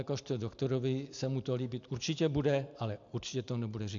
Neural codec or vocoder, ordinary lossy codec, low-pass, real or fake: none; AAC, 64 kbps; 7.2 kHz; real